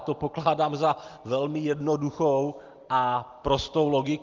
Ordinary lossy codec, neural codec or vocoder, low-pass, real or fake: Opus, 32 kbps; none; 7.2 kHz; real